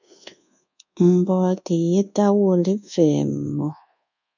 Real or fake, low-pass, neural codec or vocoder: fake; 7.2 kHz; codec, 24 kHz, 1.2 kbps, DualCodec